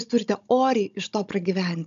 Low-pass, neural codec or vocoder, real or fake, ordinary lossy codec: 7.2 kHz; codec, 16 kHz, 16 kbps, FunCodec, trained on Chinese and English, 50 frames a second; fake; MP3, 64 kbps